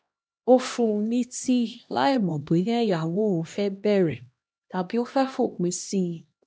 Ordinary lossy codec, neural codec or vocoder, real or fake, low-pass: none; codec, 16 kHz, 1 kbps, X-Codec, HuBERT features, trained on LibriSpeech; fake; none